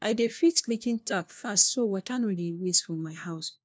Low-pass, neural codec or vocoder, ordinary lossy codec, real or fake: none; codec, 16 kHz, 1 kbps, FunCodec, trained on LibriTTS, 50 frames a second; none; fake